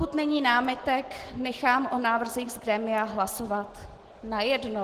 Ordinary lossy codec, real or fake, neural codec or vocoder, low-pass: Opus, 16 kbps; real; none; 14.4 kHz